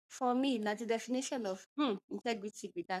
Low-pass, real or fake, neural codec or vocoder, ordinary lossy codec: 14.4 kHz; fake; codec, 44.1 kHz, 3.4 kbps, Pupu-Codec; none